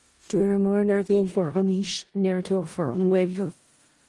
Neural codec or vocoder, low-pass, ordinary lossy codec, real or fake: codec, 16 kHz in and 24 kHz out, 0.4 kbps, LongCat-Audio-Codec, four codebook decoder; 10.8 kHz; Opus, 24 kbps; fake